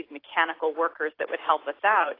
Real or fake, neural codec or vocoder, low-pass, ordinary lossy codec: real; none; 5.4 kHz; AAC, 24 kbps